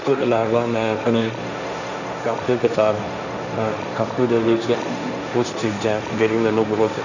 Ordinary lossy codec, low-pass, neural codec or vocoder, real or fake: none; 7.2 kHz; codec, 16 kHz, 1.1 kbps, Voila-Tokenizer; fake